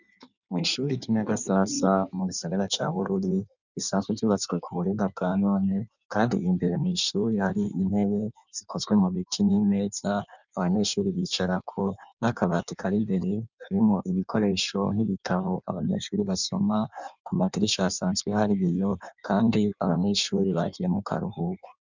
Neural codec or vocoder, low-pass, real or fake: codec, 16 kHz in and 24 kHz out, 1.1 kbps, FireRedTTS-2 codec; 7.2 kHz; fake